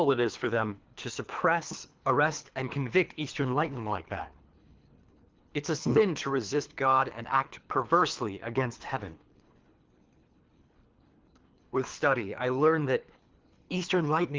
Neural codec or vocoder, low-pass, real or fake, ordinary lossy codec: codec, 24 kHz, 3 kbps, HILCodec; 7.2 kHz; fake; Opus, 32 kbps